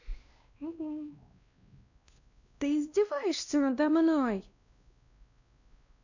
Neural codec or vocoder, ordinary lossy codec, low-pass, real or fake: codec, 16 kHz, 1 kbps, X-Codec, WavLM features, trained on Multilingual LibriSpeech; none; 7.2 kHz; fake